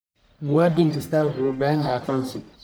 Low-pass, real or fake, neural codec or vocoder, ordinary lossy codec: none; fake; codec, 44.1 kHz, 1.7 kbps, Pupu-Codec; none